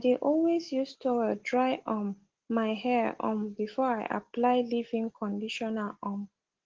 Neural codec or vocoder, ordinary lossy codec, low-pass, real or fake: none; Opus, 16 kbps; 7.2 kHz; real